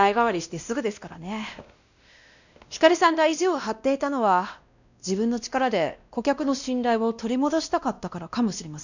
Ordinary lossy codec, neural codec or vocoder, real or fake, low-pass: none; codec, 16 kHz, 1 kbps, X-Codec, WavLM features, trained on Multilingual LibriSpeech; fake; 7.2 kHz